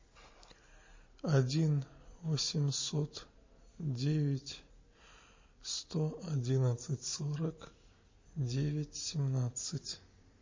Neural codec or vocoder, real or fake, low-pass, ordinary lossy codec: none; real; 7.2 kHz; MP3, 32 kbps